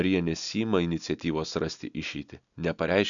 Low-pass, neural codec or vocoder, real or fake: 7.2 kHz; none; real